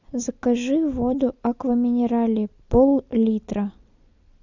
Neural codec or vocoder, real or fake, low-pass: none; real; 7.2 kHz